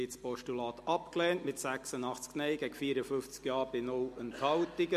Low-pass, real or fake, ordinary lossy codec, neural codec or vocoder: 14.4 kHz; real; MP3, 64 kbps; none